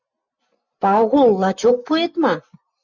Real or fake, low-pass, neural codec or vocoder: real; 7.2 kHz; none